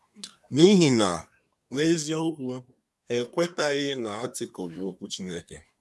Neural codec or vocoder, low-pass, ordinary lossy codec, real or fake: codec, 24 kHz, 1 kbps, SNAC; none; none; fake